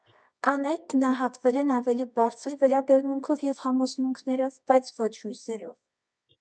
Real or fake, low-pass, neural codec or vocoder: fake; 9.9 kHz; codec, 24 kHz, 0.9 kbps, WavTokenizer, medium music audio release